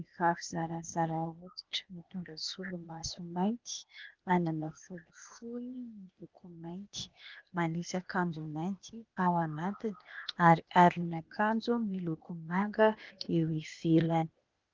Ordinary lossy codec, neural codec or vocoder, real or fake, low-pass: Opus, 32 kbps; codec, 16 kHz, 0.8 kbps, ZipCodec; fake; 7.2 kHz